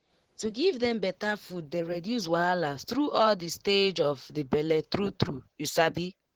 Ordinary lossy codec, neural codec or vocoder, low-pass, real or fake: Opus, 16 kbps; vocoder, 44.1 kHz, 128 mel bands, Pupu-Vocoder; 19.8 kHz; fake